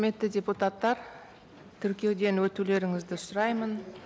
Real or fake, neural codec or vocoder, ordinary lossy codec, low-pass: real; none; none; none